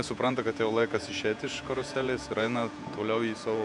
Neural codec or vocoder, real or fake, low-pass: vocoder, 48 kHz, 128 mel bands, Vocos; fake; 10.8 kHz